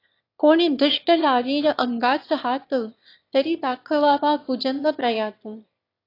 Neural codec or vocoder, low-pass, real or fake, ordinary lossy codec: autoencoder, 22.05 kHz, a latent of 192 numbers a frame, VITS, trained on one speaker; 5.4 kHz; fake; AAC, 32 kbps